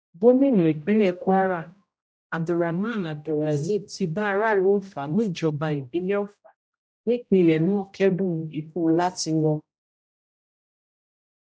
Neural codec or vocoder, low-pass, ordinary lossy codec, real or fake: codec, 16 kHz, 0.5 kbps, X-Codec, HuBERT features, trained on general audio; none; none; fake